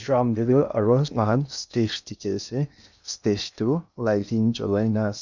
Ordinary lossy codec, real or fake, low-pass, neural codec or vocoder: none; fake; 7.2 kHz; codec, 16 kHz in and 24 kHz out, 0.6 kbps, FocalCodec, streaming, 2048 codes